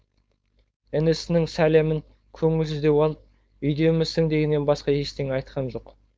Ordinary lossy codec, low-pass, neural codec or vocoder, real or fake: none; none; codec, 16 kHz, 4.8 kbps, FACodec; fake